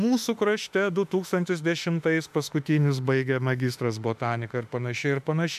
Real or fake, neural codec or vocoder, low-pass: fake; autoencoder, 48 kHz, 32 numbers a frame, DAC-VAE, trained on Japanese speech; 14.4 kHz